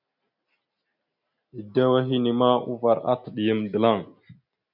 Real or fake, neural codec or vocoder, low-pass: real; none; 5.4 kHz